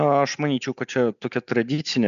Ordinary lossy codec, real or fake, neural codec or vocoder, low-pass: MP3, 96 kbps; real; none; 7.2 kHz